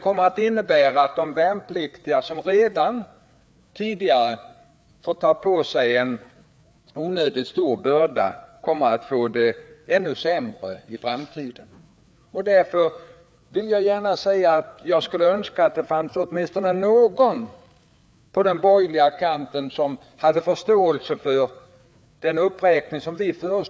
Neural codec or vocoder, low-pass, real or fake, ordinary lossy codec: codec, 16 kHz, 4 kbps, FreqCodec, larger model; none; fake; none